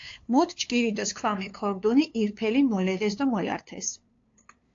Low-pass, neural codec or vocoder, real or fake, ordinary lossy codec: 7.2 kHz; codec, 16 kHz, 2 kbps, FunCodec, trained on LibriTTS, 25 frames a second; fake; AAC, 48 kbps